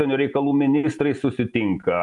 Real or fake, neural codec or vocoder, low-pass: real; none; 10.8 kHz